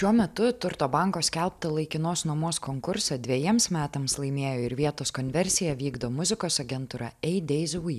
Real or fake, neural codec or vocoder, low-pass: real; none; 14.4 kHz